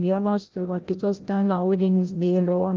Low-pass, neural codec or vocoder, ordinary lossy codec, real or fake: 7.2 kHz; codec, 16 kHz, 0.5 kbps, FreqCodec, larger model; Opus, 32 kbps; fake